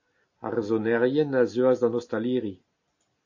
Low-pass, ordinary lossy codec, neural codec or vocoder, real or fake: 7.2 kHz; AAC, 48 kbps; none; real